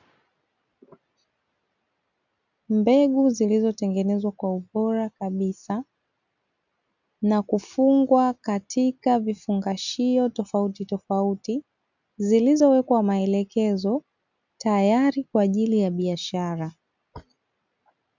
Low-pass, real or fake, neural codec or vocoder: 7.2 kHz; real; none